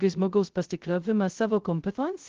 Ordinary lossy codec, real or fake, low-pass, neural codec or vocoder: Opus, 16 kbps; fake; 7.2 kHz; codec, 16 kHz, 0.2 kbps, FocalCodec